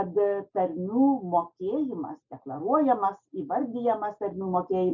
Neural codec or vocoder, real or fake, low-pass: none; real; 7.2 kHz